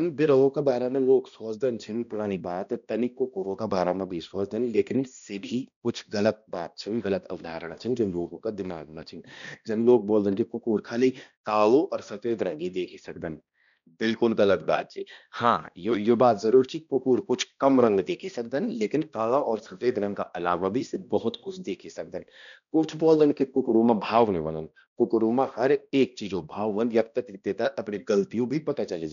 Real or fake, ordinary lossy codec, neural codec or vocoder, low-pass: fake; none; codec, 16 kHz, 1 kbps, X-Codec, HuBERT features, trained on balanced general audio; 7.2 kHz